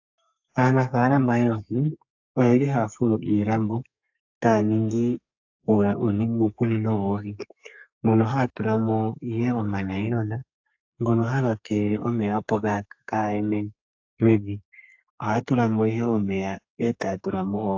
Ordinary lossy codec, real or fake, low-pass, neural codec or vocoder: AAC, 48 kbps; fake; 7.2 kHz; codec, 32 kHz, 1.9 kbps, SNAC